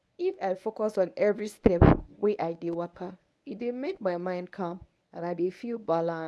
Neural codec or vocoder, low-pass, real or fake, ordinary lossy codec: codec, 24 kHz, 0.9 kbps, WavTokenizer, medium speech release version 1; none; fake; none